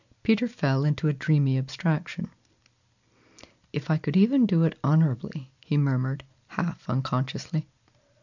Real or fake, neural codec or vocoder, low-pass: real; none; 7.2 kHz